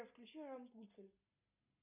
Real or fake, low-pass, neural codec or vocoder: real; 3.6 kHz; none